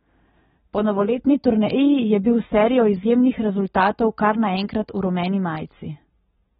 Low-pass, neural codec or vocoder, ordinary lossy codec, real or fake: 19.8 kHz; none; AAC, 16 kbps; real